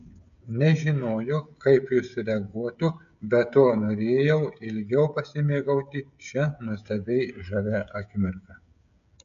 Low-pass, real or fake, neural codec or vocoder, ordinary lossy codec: 7.2 kHz; fake; codec, 16 kHz, 16 kbps, FreqCodec, smaller model; AAC, 96 kbps